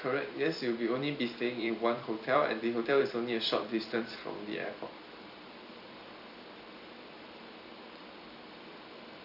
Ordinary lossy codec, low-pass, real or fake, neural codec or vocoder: none; 5.4 kHz; fake; vocoder, 44.1 kHz, 128 mel bands every 256 samples, BigVGAN v2